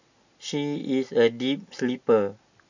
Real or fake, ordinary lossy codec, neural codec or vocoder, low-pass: real; none; none; 7.2 kHz